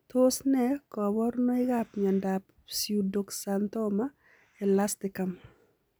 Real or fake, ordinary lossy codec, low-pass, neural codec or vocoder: real; none; none; none